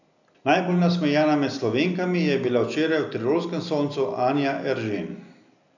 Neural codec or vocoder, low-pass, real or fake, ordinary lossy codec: none; 7.2 kHz; real; none